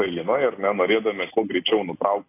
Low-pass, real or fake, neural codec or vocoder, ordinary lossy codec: 3.6 kHz; real; none; AAC, 24 kbps